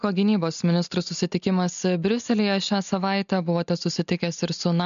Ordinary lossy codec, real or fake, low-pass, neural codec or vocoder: MP3, 96 kbps; real; 7.2 kHz; none